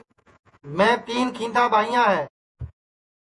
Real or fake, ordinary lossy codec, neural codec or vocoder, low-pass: fake; MP3, 48 kbps; vocoder, 48 kHz, 128 mel bands, Vocos; 10.8 kHz